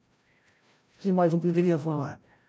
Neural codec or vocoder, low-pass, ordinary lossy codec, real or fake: codec, 16 kHz, 0.5 kbps, FreqCodec, larger model; none; none; fake